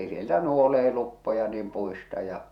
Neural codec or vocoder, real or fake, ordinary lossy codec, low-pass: vocoder, 48 kHz, 128 mel bands, Vocos; fake; none; 19.8 kHz